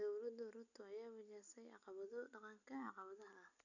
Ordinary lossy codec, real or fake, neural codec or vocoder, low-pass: none; real; none; 7.2 kHz